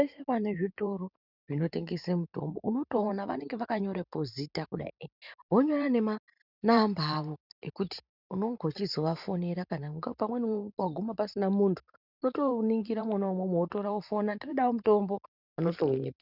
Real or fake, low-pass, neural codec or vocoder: fake; 5.4 kHz; vocoder, 44.1 kHz, 128 mel bands every 512 samples, BigVGAN v2